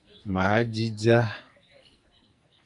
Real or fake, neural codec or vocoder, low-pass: fake; codec, 44.1 kHz, 2.6 kbps, SNAC; 10.8 kHz